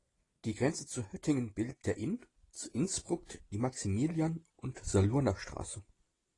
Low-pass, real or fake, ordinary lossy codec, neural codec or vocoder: 10.8 kHz; real; AAC, 32 kbps; none